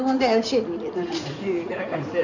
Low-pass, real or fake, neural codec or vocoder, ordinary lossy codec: 7.2 kHz; fake; codec, 16 kHz in and 24 kHz out, 2.2 kbps, FireRedTTS-2 codec; none